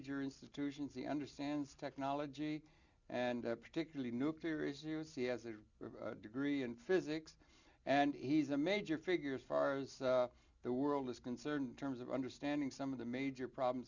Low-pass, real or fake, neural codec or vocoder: 7.2 kHz; real; none